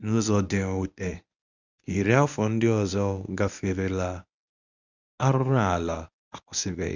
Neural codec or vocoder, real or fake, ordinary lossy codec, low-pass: codec, 24 kHz, 0.9 kbps, WavTokenizer, medium speech release version 1; fake; none; 7.2 kHz